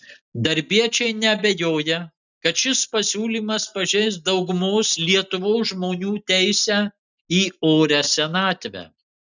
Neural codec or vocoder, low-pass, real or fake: none; 7.2 kHz; real